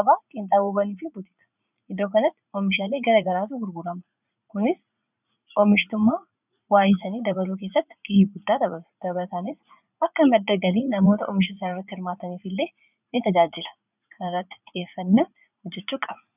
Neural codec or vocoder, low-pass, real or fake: vocoder, 44.1 kHz, 80 mel bands, Vocos; 3.6 kHz; fake